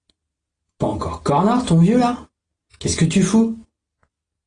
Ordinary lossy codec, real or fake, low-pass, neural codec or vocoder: AAC, 32 kbps; real; 9.9 kHz; none